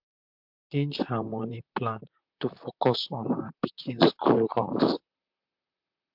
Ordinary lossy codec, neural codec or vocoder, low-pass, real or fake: MP3, 48 kbps; vocoder, 44.1 kHz, 128 mel bands, Pupu-Vocoder; 5.4 kHz; fake